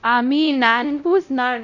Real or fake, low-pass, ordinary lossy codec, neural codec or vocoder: fake; 7.2 kHz; none; codec, 16 kHz, 0.5 kbps, X-Codec, WavLM features, trained on Multilingual LibriSpeech